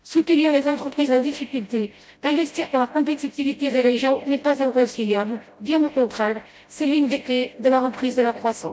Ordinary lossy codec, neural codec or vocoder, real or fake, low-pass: none; codec, 16 kHz, 0.5 kbps, FreqCodec, smaller model; fake; none